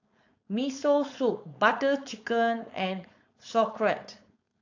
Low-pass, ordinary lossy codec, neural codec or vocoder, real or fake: 7.2 kHz; AAC, 48 kbps; codec, 16 kHz, 4.8 kbps, FACodec; fake